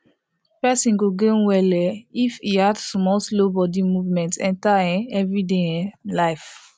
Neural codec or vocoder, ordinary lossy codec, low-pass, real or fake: none; none; none; real